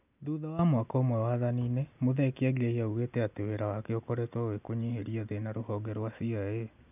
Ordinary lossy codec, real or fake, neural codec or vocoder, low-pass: none; real; none; 3.6 kHz